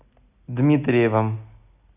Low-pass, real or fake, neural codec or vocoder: 3.6 kHz; real; none